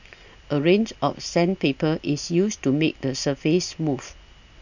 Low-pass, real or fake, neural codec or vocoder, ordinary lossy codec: 7.2 kHz; real; none; none